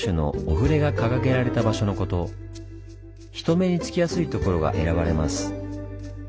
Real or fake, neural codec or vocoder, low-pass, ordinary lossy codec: real; none; none; none